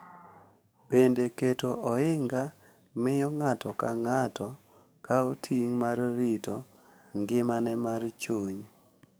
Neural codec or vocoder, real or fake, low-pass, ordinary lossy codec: codec, 44.1 kHz, 7.8 kbps, DAC; fake; none; none